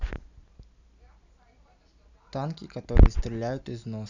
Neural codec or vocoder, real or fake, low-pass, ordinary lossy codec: none; real; 7.2 kHz; none